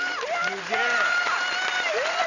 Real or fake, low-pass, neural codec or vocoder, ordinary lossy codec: fake; 7.2 kHz; vocoder, 44.1 kHz, 128 mel bands every 512 samples, BigVGAN v2; none